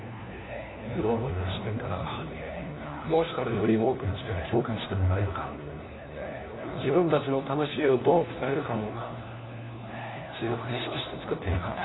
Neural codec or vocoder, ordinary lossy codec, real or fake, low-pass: codec, 16 kHz, 1 kbps, FunCodec, trained on LibriTTS, 50 frames a second; AAC, 16 kbps; fake; 7.2 kHz